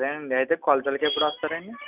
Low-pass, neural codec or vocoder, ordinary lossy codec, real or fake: 3.6 kHz; none; none; real